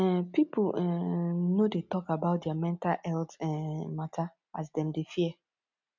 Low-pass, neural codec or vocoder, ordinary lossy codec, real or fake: 7.2 kHz; none; none; real